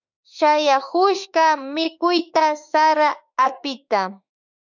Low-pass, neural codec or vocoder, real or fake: 7.2 kHz; autoencoder, 48 kHz, 32 numbers a frame, DAC-VAE, trained on Japanese speech; fake